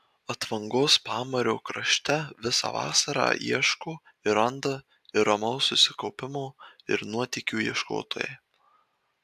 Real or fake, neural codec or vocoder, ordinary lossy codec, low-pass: real; none; AAC, 96 kbps; 14.4 kHz